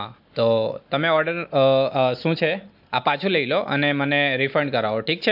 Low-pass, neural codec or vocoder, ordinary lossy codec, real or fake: 5.4 kHz; none; MP3, 48 kbps; real